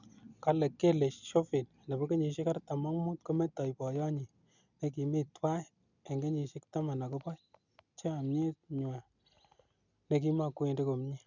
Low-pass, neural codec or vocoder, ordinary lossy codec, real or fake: 7.2 kHz; none; none; real